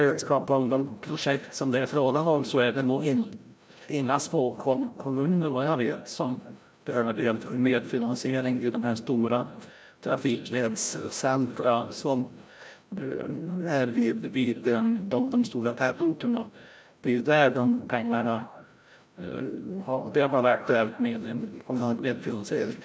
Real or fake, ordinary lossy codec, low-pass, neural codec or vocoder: fake; none; none; codec, 16 kHz, 0.5 kbps, FreqCodec, larger model